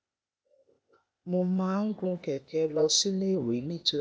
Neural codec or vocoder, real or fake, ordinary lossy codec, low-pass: codec, 16 kHz, 0.8 kbps, ZipCodec; fake; none; none